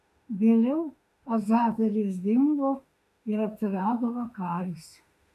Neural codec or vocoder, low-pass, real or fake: autoencoder, 48 kHz, 32 numbers a frame, DAC-VAE, trained on Japanese speech; 14.4 kHz; fake